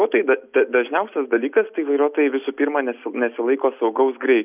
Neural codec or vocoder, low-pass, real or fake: none; 3.6 kHz; real